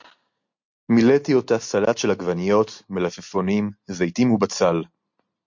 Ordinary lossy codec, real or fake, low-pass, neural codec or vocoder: MP3, 48 kbps; real; 7.2 kHz; none